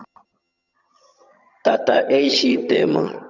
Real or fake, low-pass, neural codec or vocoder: fake; 7.2 kHz; vocoder, 22.05 kHz, 80 mel bands, HiFi-GAN